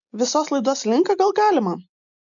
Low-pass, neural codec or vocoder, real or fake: 7.2 kHz; none; real